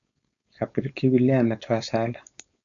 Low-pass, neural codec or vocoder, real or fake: 7.2 kHz; codec, 16 kHz, 4.8 kbps, FACodec; fake